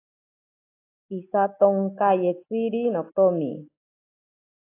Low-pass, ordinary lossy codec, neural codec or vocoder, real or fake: 3.6 kHz; AAC, 24 kbps; none; real